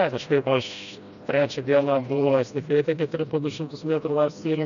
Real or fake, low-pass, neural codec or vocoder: fake; 7.2 kHz; codec, 16 kHz, 1 kbps, FreqCodec, smaller model